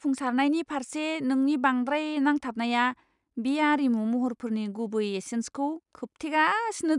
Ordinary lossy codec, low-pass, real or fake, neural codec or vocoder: none; 10.8 kHz; real; none